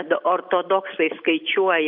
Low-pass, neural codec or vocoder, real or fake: 5.4 kHz; none; real